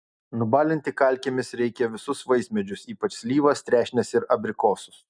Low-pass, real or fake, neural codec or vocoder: 9.9 kHz; real; none